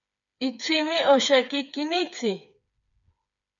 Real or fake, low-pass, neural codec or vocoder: fake; 7.2 kHz; codec, 16 kHz, 4 kbps, FreqCodec, smaller model